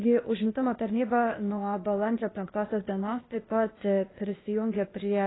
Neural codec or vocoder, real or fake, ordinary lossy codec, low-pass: codec, 16 kHz, 0.8 kbps, ZipCodec; fake; AAC, 16 kbps; 7.2 kHz